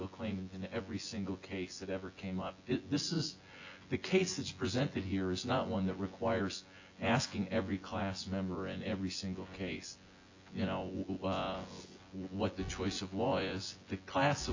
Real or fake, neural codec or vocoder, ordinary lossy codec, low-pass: fake; vocoder, 24 kHz, 100 mel bands, Vocos; AAC, 48 kbps; 7.2 kHz